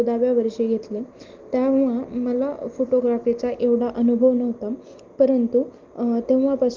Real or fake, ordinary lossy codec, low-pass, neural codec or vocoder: real; Opus, 32 kbps; 7.2 kHz; none